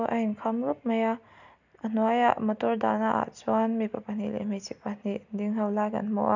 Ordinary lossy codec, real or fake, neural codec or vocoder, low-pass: none; real; none; 7.2 kHz